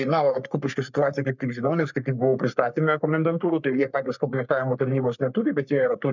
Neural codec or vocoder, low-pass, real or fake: codec, 44.1 kHz, 3.4 kbps, Pupu-Codec; 7.2 kHz; fake